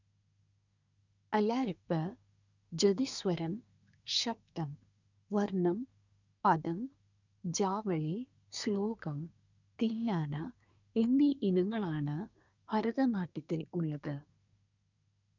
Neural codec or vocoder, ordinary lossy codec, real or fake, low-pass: codec, 24 kHz, 1 kbps, SNAC; none; fake; 7.2 kHz